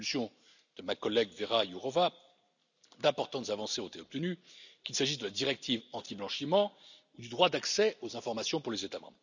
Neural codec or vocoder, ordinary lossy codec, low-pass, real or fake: none; none; 7.2 kHz; real